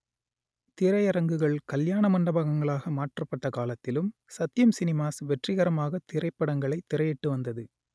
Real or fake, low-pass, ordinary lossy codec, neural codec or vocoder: real; none; none; none